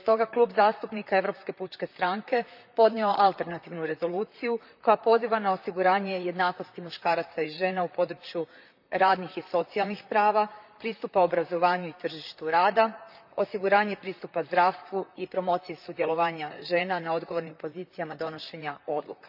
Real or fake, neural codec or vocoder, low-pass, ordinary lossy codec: fake; vocoder, 44.1 kHz, 128 mel bands, Pupu-Vocoder; 5.4 kHz; none